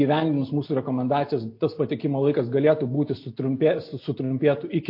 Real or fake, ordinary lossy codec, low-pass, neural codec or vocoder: real; MP3, 32 kbps; 5.4 kHz; none